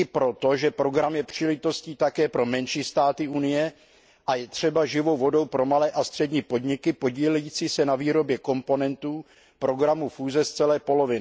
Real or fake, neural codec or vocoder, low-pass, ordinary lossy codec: real; none; none; none